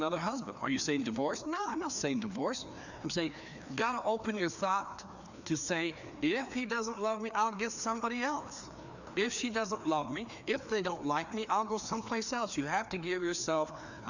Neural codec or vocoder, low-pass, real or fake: codec, 16 kHz, 2 kbps, FreqCodec, larger model; 7.2 kHz; fake